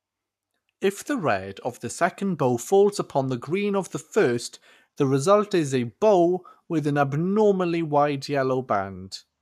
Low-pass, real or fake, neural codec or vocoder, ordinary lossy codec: 14.4 kHz; fake; codec, 44.1 kHz, 7.8 kbps, Pupu-Codec; none